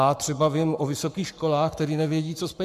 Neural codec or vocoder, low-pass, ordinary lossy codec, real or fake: codec, 44.1 kHz, 7.8 kbps, Pupu-Codec; 14.4 kHz; MP3, 96 kbps; fake